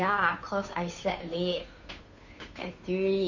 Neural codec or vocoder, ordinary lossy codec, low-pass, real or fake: codec, 16 kHz, 2 kbps, FunCodec, trained on Chinese and English, 25 frames a second; none; 7.2 kHz; fake